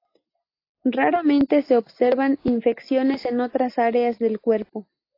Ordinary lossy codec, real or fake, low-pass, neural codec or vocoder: AAC, 32 kbps; real; 5.4 kHz; none